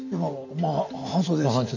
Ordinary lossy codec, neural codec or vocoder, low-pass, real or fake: none; none; 7.2 kHz; real